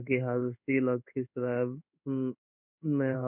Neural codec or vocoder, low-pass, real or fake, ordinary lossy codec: codec, 16 kHz in and 24 kHz out, 1 kbps, XY-Tokenizer; 3.6 kHz; fake; none